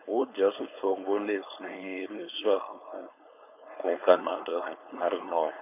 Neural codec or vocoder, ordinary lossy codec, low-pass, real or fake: codec, 16 kHz, 4.8 kbps, FACodec; none; 3.6 kHz; fake